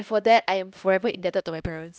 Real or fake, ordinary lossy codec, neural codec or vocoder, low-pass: fake; none; codec, 16 kHz, 1 kbps, X-Codec, HuBERT features, trained on LibriSpeech; none